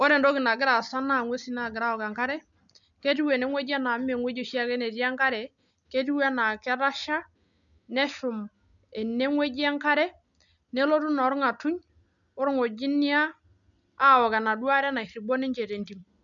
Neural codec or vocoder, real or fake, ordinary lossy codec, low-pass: none; real; none; 7.2 kHz